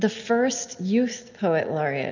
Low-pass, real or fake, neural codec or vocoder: 7.2 kHz; real; none